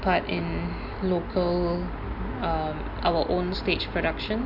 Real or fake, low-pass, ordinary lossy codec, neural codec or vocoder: real; 5.4 kHz; MP3, 48 kbps; none